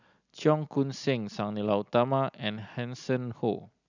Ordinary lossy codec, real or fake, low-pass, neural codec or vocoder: none; real; 7.2 kHz; none